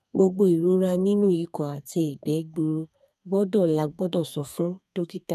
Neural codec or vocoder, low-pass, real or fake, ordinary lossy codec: codec, 32 kHz, 1.9 kbps, SNAC; 14.4 kHz; fake; none